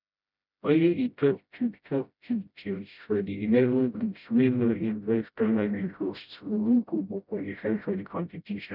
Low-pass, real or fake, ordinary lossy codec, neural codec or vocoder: 5.4 kHz; fake; none; codec, 16 kHz, 0.5 kbps, FreqCodec, smaller model